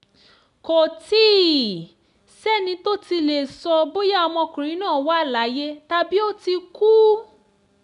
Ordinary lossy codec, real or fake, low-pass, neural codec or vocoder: none; real; 10.8 kHz; none